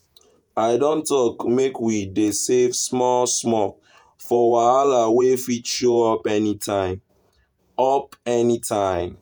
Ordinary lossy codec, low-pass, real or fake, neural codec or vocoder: none; none; fake; vocoder, 48 kHz, 128 mel bands, Vocos